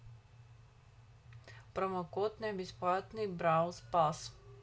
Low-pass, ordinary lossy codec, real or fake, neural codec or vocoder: none; none; real; none